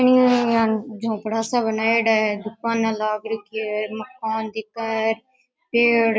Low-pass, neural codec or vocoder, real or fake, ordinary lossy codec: none; none; real; none